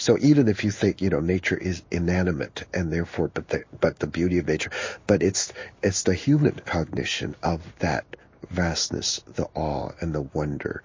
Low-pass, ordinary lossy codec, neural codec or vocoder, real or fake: 7.2 kHz; MP3, 32 kbps; codec, 16 kHz in and 24 kHz out, 1 kbps, XY-Tokenizer; fake